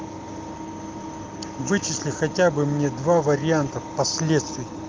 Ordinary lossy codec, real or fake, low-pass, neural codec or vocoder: Opus, 32 kbps; real; 7.2 kHz; none